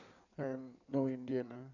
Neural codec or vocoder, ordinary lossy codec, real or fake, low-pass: codec, 16 kHz in and 24 kHz out, 2.2 kbps, FireRedTTS-2 codec; none; fake; 7.2 kHz